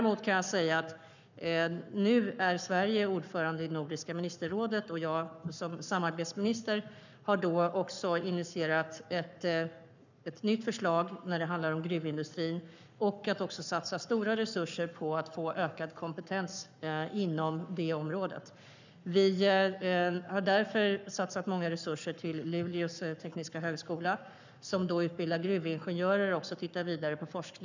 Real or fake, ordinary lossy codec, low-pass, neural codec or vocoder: fake; none; 7.2 kHz; codec, 44.1 kHz, 7.8 kbps, Pupu-Codec